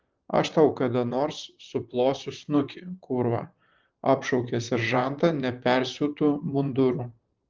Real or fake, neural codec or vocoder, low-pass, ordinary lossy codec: fake; vocoder, 44.1 kHz, 128 mel bands, Pupu-Vocoder; 7.2 kHz; Opus, 32 kbps